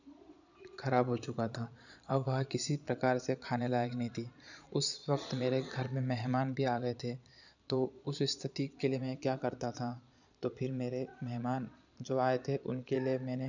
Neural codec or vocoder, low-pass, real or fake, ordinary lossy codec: vocoder, 22.05 kHz, 80 mel bands, Vocos; 7.2 kHz; fake; none